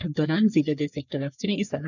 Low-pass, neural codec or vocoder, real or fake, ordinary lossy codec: 7.2 kHz; codec, 44.1 kHz, 3.4 kbps, Pupu-Codec; fake; none